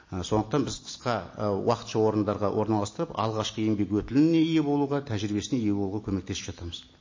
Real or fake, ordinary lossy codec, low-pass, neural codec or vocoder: real; MP3, 32 kbps; 7.2 kHz; none